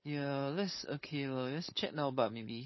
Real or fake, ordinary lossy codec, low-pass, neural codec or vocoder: fake; MP3, 24 kbps; 7.2 kHz; codec, 16 kHz, 4.8 kbps, FACodec